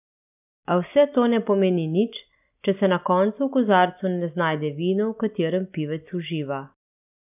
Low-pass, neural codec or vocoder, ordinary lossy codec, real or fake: 3.6 kHz; none; none; real